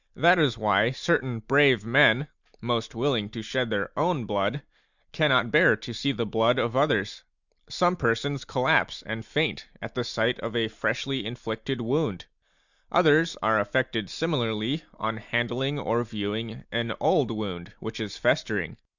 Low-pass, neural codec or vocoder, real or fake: 7.2 kHz; none; real